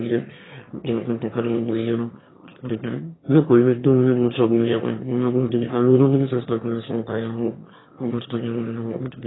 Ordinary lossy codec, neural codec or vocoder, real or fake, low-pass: AAC, 16 kbps; autoencoder, 22.05 kHz, a latent of 192 numbers a frame, VITS, trained on one speaker; fake; 7.2 kHz